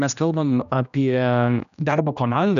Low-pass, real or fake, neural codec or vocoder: 7.2 kHz; fake; codec, 16 kHz, 1 kbps, X-Codec, HuBERT features, trained on general audio